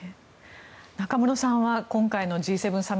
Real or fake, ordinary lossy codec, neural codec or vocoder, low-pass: real; none; none; none